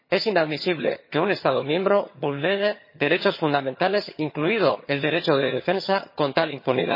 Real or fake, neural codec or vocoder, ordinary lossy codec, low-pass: fake; vocoder, 22.05 kHz, 80 mel bands, HiFi-GAN; MP3, 24 kbps; 5.4 kHz